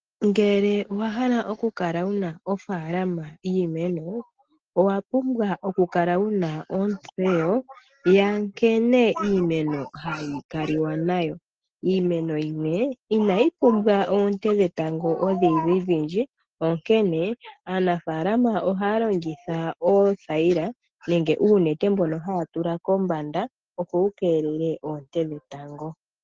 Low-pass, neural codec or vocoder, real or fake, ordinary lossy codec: 7.2 kHz; none; real; Opus, 16 kbps